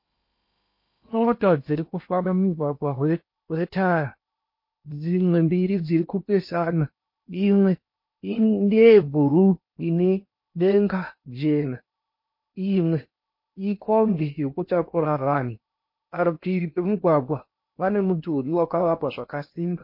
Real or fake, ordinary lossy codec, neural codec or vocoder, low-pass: fake; MP3, 32 kbps; codec, 16 kHz in and 24 kHz out, 0.8 kbps, FocalCodec, streaming, 65536 codes; 5.4 kHz